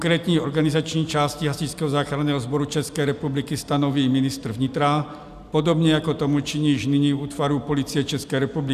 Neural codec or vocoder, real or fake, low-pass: none; real; 14.4 kHz